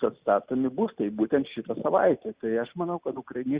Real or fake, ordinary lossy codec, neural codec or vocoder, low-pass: real; Opus, 64 kbps; none; 3.6 kHz